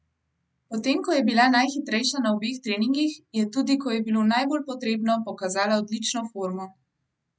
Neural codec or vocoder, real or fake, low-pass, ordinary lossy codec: none; real; none; none